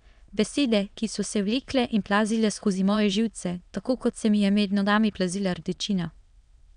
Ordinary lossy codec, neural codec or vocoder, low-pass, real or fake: none; autoencoder, 22.05 kHz, a latent of 192 numbers a frame, VITS, trained on many speakers; 9.9 kHz; fake